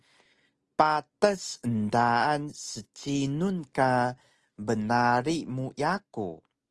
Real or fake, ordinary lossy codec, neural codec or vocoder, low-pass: real; Opus, 24 kbps; none; 10.8 kHz